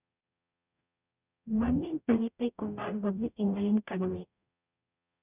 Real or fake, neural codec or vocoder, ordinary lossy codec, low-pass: fake; codec, 44.1 kHz, 0.9 kbps, DAC; none; 3.6 kHz